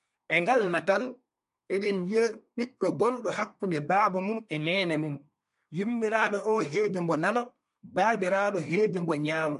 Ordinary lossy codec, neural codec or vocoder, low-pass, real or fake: MP3, 64 kbps; codec, 24 kHz, 1 kbps, SNAC; 10.8 kHz; fake